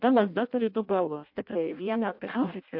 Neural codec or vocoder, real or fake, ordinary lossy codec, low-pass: codec, 16 kHz in and 24 kHz out, 0.6 kbps, FireRedTTS-2 codec; fake; MP3, 48 kbps; 5.4 kHz